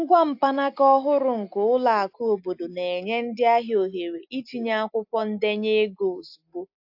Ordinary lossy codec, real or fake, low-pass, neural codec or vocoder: AAC, 48 kbps; real; 5.4 kHz; none